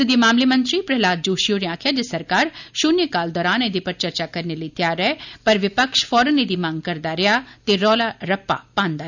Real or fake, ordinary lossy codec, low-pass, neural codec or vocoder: real; none; 7.2 kHz; none